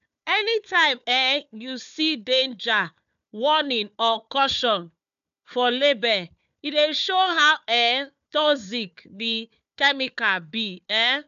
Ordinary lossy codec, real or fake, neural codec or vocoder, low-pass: MP3, 96 kbps; fake; codec, 16 kHz, 4 kbps, FunCodec, trained on Chinese and English, 50 frames a second; 7.2 kHz